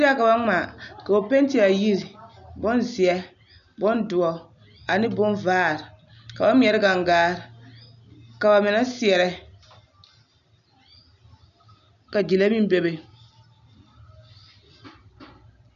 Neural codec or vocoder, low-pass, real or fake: none; 7.2 kHz; real